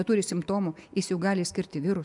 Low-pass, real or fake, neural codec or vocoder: 10.8 kHz; real; none